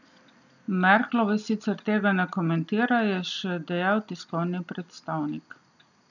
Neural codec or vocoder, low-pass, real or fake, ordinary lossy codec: none; 7.2 kHz; real; none